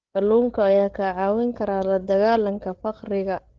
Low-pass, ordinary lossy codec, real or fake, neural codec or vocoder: 7.2 kHz; Opus, 16 kbps; fake; codec, 16 kHz, 8 kbps, FreqCodec, larger model